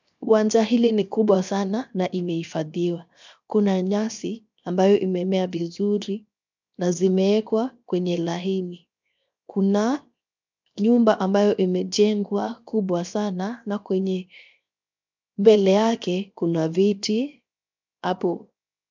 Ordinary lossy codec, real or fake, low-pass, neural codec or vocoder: MP3, 64 kbps; fake; 7.2 kHz; codec, 16 kHz, 0.7 kbps, FocalCodec